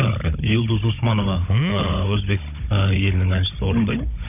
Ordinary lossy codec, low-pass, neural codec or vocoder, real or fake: none; 3.6 kHz; codec, 16 kHz, 16 kbps, FunCodec, trained on Chinese and English, 50 frames a second; fake